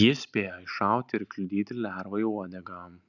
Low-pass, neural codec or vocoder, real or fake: 7.2 kHz; none; real